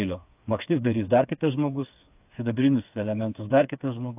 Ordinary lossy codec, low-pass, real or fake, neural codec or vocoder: AAC, 32 kbps; 3.6 kHz; fake; codec, 16 kHz, 4 kbps, FreqCodec, smaller model